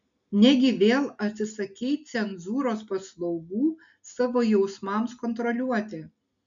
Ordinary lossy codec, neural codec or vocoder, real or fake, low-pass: MP3, 96 kbps; none; real; 7.2 kHz